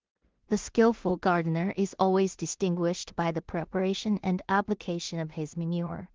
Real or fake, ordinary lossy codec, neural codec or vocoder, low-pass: fake; Opus, 16 kbps; codec, 16 kHz in and 24 kHz out, 0.4 kbps, LongCat-Audio-Codec, two codebook decoder; 7.2 kHz